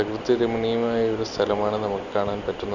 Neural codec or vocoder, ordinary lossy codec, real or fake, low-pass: none; none; real; 7.2 kHz